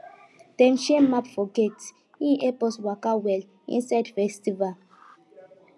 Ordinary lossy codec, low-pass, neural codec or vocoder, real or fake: none; none; none; real